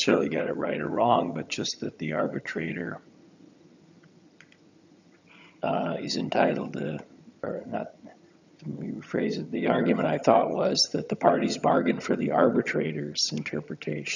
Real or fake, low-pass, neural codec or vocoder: fake; 7.2 kHz; vocoder, 22.05 kHz, 80 mel bands, HiFi-GAN